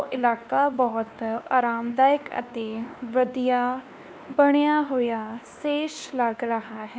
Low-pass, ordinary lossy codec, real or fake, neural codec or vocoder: none; none; fake; codec, 16 kHz, 2 kbps, X-Codec, WavLM features, trained on Multilingual LibriSpeech